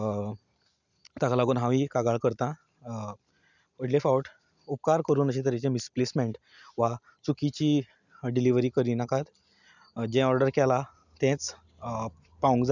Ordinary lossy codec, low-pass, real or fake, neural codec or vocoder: none; 7.2 kHz; real; none